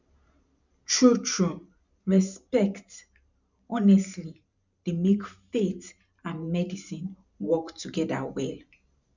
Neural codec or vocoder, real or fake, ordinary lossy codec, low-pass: vocoder, 44.1 kHz, 128 mel bands every 512 samples, BigVGAN v2; fake; none; 7.2 kHz